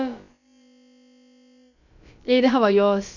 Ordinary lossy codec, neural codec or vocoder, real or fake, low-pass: none; codec, 16 kHz, about 1 kbps, DyCAST, with the encoder's durations; fake; 7.2 kHz